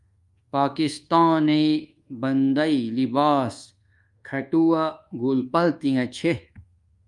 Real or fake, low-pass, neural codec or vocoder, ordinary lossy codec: fake; 10.8 kHz; codec, 24 kHz, 1.2 kbps, DualCodec; Opus, 32 kbps